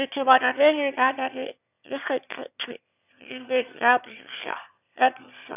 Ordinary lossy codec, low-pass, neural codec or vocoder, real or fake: none; 3.6 kHz; autoencoder, 22.05 kHz, a latent of 192 numbers a frame, VITS, trained on one speaker; fake